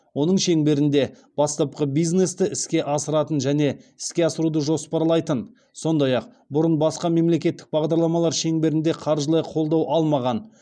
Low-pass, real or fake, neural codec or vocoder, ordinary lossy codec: 9.9 kHz; real; none; none